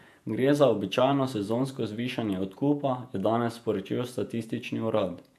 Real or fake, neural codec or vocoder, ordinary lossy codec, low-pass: fake; vocoder, 48 kHz, 128 mel bands, Vocos; AAC, 96 kbps; 14.4 kHz